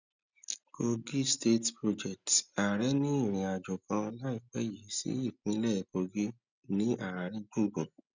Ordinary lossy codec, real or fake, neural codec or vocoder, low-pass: none; real; none; 7.2 kHz